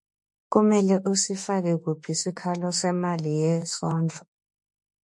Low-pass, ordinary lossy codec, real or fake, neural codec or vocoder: 10.8 kHz; MP3, 48 kbps; fake; autoencoder, 48 kHz, 32 numbers a frame, DAC-VAE, trained on Japanese speech